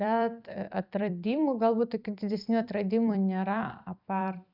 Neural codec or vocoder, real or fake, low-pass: vocoder, 44.1 kHz, 128 mel bands every 256 samples, BigVGAN v2; fake; 5.4 kHz